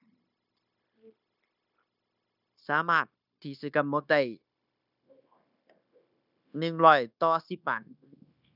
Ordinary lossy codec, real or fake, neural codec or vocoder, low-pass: none; fake; codec, 16 kHz, 0.9 kbps, LongCat-Audio-Codec; 5.4 kHz